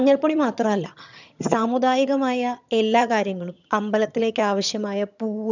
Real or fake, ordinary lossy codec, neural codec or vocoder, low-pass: fake; none; vocoder, 22.05 kHz, 80 mel bands, HiFi-GAN; 7.2 kHz